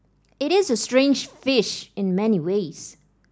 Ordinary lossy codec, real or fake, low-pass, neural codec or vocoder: none; real; none; none